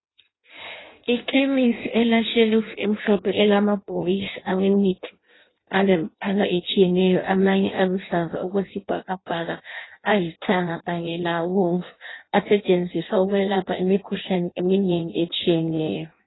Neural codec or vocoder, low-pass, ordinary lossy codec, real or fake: codec, 16 kHz in and 24 kHz out, 0.6 kbps, FireRedTTS-2 codec; 7.2 kHz; AAC, 16 kbps; fake